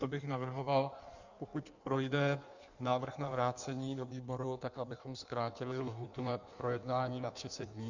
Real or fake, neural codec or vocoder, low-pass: fake; codec, 16 kHz in and 24 kHz out, 1.1 kbps, FireRedTTS-2 codec; 7.2 kHz